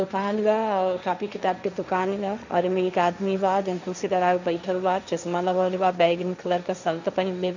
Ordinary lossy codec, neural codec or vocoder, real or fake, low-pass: none; codec, 16 kHz, 1.1 kbps, Voila-Tokenizer; fake; 7.2 kHz